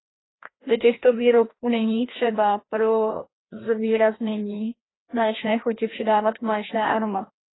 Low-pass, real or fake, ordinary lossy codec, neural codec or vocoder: 7.2 kHz; fake; AAC, 16 kbps; codec, 16 kHz, 1 kbps, FreqCodec, larger model